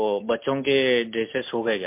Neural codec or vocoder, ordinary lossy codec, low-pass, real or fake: none; MP3, 32 kbps; 3.6 kHz; real